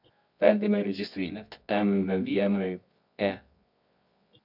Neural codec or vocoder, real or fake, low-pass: codec, 24 kHz, 0.9 kbps, WavTokenizer, medium music audio release; fake; 5.4 kHz